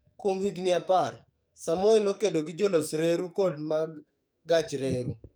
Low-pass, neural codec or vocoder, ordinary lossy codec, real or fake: none; codec, 44.1 kHz, 2.6 kbps, SNAC; none; fake